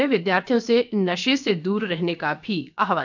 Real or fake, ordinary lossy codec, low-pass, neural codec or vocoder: fake; none; 7.2 kHz; codec, 16 kHz, about 1 kbps, DyCAST, with the encoder's durations